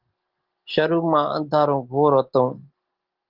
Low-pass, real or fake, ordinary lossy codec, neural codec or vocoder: 5.4 kHz; real; Opus, 16 kbps; none